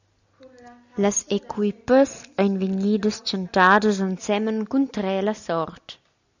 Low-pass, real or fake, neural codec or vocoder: 7.2 kHz; real; none